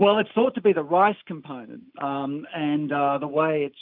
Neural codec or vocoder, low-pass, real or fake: none; 5.4 kHz; real